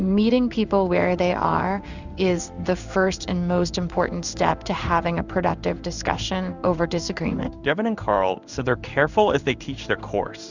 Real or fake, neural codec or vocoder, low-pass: fake; codec, 16 kHz in and 24 kHz out, 1 kbps, XY-Tokenizer; 7.2 kHz